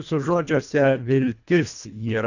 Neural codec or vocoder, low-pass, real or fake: codec, 24 kHz, 1.5 kbps, HILCodec; 7.2 kHz; fake